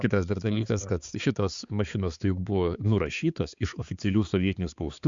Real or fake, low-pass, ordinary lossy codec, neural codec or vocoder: fake; 7.2 kHz; Opus, 64 kbps; codec, 16 kHz, 4 kbps, X-Codec, HuBERT features, trained on balanced general audio